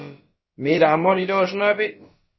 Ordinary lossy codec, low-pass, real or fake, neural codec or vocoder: MP3, 24 kbps; 7.2 kHz; fake; codec, 16 kHz, about 1 kbps, DyCAST, with the encoder's durations